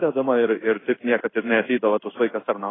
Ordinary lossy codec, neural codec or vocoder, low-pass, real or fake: AAC, 16 kbps; codec, 16 kHz in and 24 kHz out, 1 kbps, XY-Tokenizer; 7.2 kHz; fake